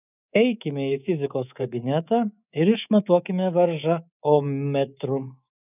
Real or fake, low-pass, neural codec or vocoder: fake; 3.6 kHz; codec, 24 kHz, 3.1 kbps, DualCodec